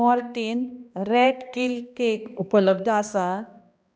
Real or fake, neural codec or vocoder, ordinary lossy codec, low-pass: fake; codec, 16 kHz, 1 kbps, X-Codec, HuBERT features, trained on balanced general audio; none; none